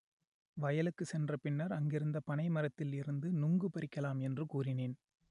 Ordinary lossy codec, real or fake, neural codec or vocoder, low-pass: none; real; none; 10.8 kHz